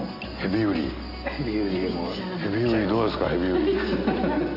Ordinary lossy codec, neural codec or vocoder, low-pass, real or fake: none; none; 5.4 kHz; real